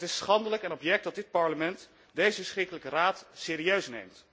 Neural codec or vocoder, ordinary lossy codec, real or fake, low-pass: none; none; real; none